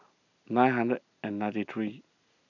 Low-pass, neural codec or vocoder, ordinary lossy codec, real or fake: 7.2 kHz; none; none; real